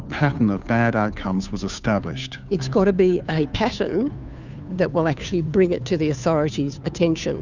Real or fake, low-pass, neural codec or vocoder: fake; 7.2 kHz; codec, 16 kHz, 2 kbps, FunCodec, trained on Chinese and English, 25 frames a second